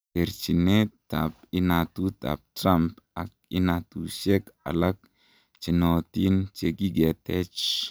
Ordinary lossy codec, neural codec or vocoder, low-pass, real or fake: none; none; none; real